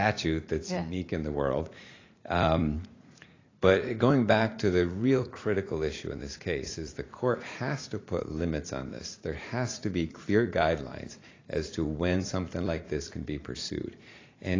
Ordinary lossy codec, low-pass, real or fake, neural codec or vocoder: AAC, 32 kbps; 7.2 kHz; fake; vocoder, 44.1 kHz, 80 mel bands, Vocos